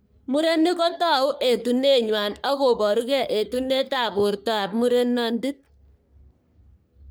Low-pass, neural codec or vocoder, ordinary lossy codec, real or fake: none; codec, 44.1 kHz, 3.4 kbps, Pupu-Codec; none; fake